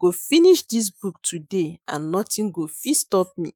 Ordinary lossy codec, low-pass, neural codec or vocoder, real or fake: none; none; autoencoder, 48 kHz, 128 numbers a frame, DAC-VAE, trained on Japanese speech; fake